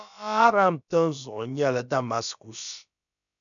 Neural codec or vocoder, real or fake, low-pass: codec, 16 kHz, about 1 kbps, DyCAST, with the encoder's durations; fake; 7.2 kHz